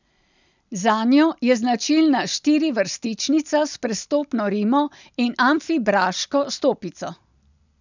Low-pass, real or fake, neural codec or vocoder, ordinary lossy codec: 7.2 kHz; real; none; none